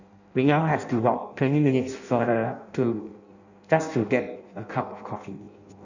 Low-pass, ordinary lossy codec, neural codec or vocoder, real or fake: 7.2 kHz; none; codec, 16 kHz in and 24 kHz out, 0.6 kbps, FireRedTTS-2 codec; fake